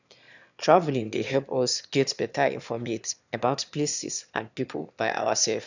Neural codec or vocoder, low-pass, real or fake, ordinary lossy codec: autoencoder, 22.05 kHz, a latent of 192 numbers a frame, VITS, trained on one speaker; 7.2 kHz; fake; none